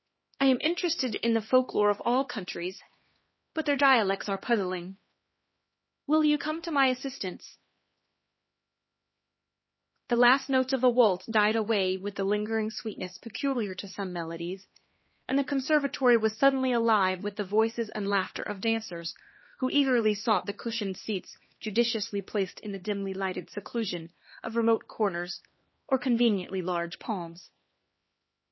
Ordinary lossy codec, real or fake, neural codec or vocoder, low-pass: MP3, 24 kbps; fake; codec, 16 kHz, 2 kbps, X-Codec, HuBERT features, trained on LibriSpeech; 7.2 kHz